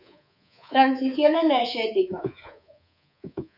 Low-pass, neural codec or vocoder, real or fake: 5.4 kHz; codec, 24 kHz, 3.1 kbps, DualCodec; fake